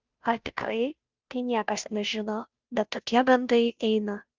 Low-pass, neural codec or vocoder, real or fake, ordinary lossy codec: 7.2 kHz; codec, 16 kHz, 0.5 kbps, FunCodec, trained on Chinese and English, 25 frames a second; fake; Opus, 24 kbps